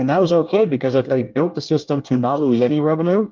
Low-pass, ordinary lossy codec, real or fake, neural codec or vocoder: 7.2 kHz; Opus, 24 kbps; fake; codec, 24 kHz, 1 kbps, SNAC